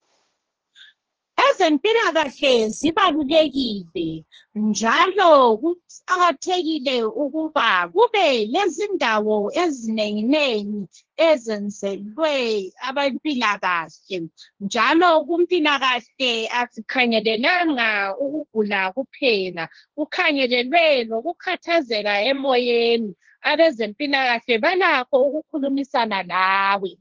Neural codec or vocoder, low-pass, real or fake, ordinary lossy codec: codec, 16 kHz, 1.1 kbps, Voila-Tokenizer; 7.2 kHz; fake; Opus, 16 kbps